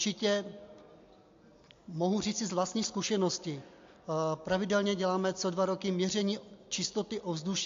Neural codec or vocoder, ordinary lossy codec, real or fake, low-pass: none; AAC, 48 kbps; real; 7.2 kHz